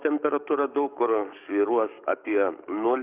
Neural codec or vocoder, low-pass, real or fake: codec, 44.1 kHz, 7.8 kbps, DAC; 3.6 kHz; fake